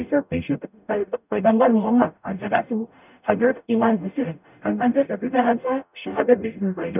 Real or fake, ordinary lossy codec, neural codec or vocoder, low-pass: fake; none; codec, 44.1 kHz, 0.9 kbps, DAC; 3.6 kHz